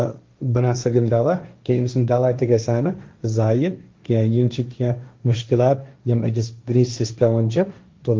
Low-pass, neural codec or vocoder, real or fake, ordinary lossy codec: 7.2 kHz; codec, 16 kHz, 1.1 kbps, Voila-Tokenizer; fake; Opus, 32 kbps